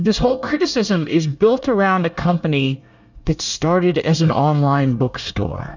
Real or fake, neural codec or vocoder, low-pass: fake; codec, 24 kHz, 1 kbps, SNAC; 7.2 kHz